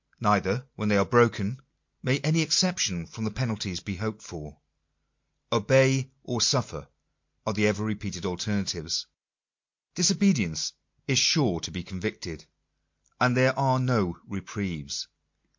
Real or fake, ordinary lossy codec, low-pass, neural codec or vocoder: real; MP3, 48 kbps; 7.2 kHz; none